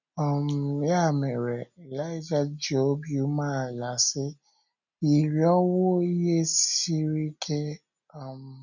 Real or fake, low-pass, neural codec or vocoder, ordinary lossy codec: real; 7.2 kHz; none; none